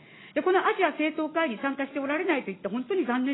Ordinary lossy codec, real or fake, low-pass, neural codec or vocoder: AAC, 16 kbps; real; 7.2 kHz; none